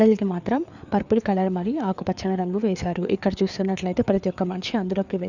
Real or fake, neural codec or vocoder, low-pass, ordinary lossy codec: fake; codec, 16 kHz, 4 kbps, FreqCodec, larger model; 7.2 kHz; none